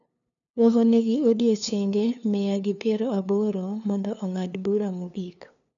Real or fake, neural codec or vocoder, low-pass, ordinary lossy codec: fake; codec, 16 kHz, 2 kbps, FunCodec, trained on LibriTTS, 25 frames a second; 7.2 kHz; none